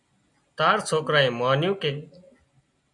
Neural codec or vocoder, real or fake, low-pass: none; real; 10.8 kHz